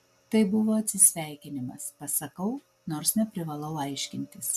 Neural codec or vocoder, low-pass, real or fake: none; 14.4 kHz; real